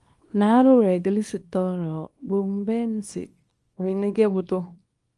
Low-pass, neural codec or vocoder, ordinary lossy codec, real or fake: 10.8 kHz; codec, 24 kHz, 0.9 kbps, WavTokenizer, small release; Opus, 32 kbps; fake